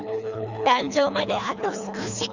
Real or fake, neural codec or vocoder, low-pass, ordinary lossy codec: fake; codec, 24 kHz, 3 kbps, HILCodec; 7.2 kHz; none